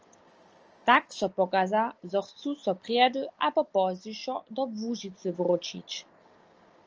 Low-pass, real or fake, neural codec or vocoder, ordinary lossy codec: 7.2 kHz; real; none; Opus, 24 kbps